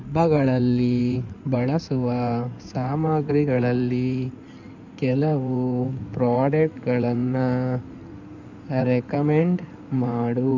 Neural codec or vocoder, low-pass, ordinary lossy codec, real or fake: codec, 16 kHz in and 24 kHz out, 2.2 kbps, FireRedTTS-2 codec; 7.2 kHz; none; fake